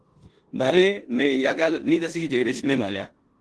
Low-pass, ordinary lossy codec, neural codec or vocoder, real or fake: 10.8 kHz; Opus, 16 kbps; codec, 16 kHz in and 24 kHz out, 0.9 kbps, LongCat-Audio-Codec, four codebook decoder; fake